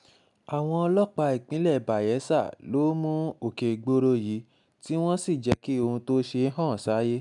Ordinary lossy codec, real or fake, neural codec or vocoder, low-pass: none; real; none; 10.8 kHz